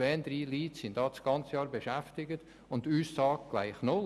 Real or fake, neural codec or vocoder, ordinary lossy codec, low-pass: real; none; none; none